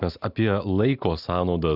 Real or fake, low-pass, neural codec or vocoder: real; 5.4 kHz; none